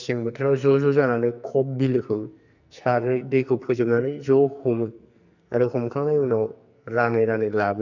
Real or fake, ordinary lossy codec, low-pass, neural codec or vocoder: fake; none; 7.2 kHz; codec, 44.1 kHz, 2.6 kbps, SNAC